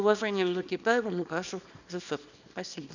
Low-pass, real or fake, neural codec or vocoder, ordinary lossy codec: 7.2 kHz; fake; codec, 24 kHz, 0.9 kbps, WavTokenizer, small release; none